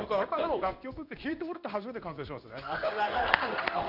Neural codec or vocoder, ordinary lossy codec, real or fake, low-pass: codec, 16 kHz in and 24 kHz out, 1 kbps, XY-Tokenizer; none; fake; 5.4 kHz